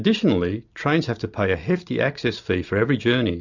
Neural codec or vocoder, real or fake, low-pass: none; real; 7.2 kHz